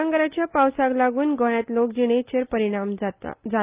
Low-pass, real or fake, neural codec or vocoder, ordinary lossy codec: 3.6 kHz; real; none; Opus, 32 kbps